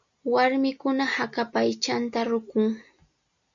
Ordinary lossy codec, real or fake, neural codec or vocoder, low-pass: MP3, 64 kbps; real; none; 7.2 kHz